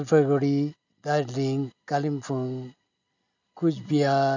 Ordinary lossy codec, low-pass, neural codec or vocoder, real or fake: none; 7.2 kHz; none; real